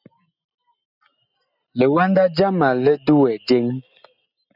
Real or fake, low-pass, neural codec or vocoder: real; 5.4 kHz; none